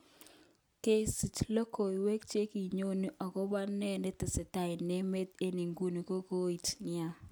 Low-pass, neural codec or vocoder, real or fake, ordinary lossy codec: none; none; real; none